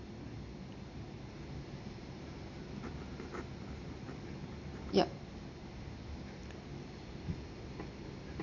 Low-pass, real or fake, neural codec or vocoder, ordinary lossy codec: 7.2 kHz; real; none; none